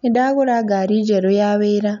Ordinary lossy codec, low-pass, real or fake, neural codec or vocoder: none; 7.2 kHz; real; none